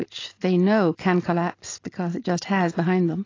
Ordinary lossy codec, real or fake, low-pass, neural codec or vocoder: AAC, 32 kbps; real; 7.2 kHz; none